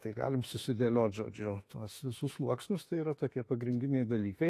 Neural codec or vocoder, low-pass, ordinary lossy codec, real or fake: autoencoder, 48 kHz, 32 numbers a frame, DAC-VAE, trained on Japanese speech; 14.4 kHz; AAC, 48 kbps; fake